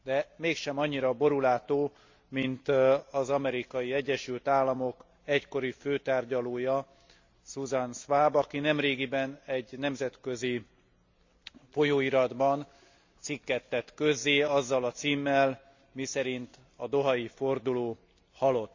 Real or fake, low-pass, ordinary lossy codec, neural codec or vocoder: real; 7.2 kHz; MP3, 64 kbps; none